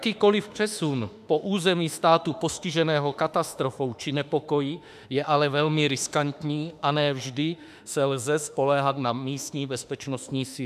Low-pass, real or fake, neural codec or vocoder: 14.4 kHz; fake; autoencoder, 48 kHz, 32 numbers a frame, DAC-VAE, trained on Japanese speech